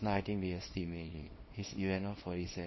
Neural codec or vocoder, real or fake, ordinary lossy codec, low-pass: codec, 24 kHz, 0.9 kbps, WavTokenizer, small release; fake; MP3, 24 kbps; 7.2 kHz